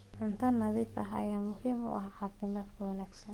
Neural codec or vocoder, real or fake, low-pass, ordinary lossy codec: codec, 44.1 kHz, 7.8 kbps, DAC; fake; 19.8 kHz; Opus, 24 kbps